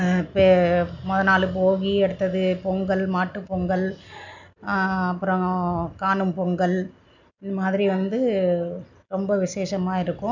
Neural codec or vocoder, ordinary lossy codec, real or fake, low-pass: none; none; real; 7.2 kHz